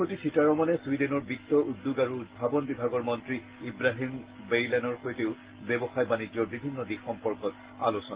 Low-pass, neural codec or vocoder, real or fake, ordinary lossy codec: 3.6 kHz; none; real; Opus, 16 kbps